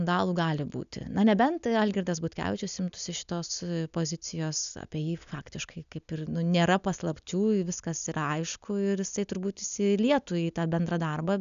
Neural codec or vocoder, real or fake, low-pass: none; real; 7.2 kHz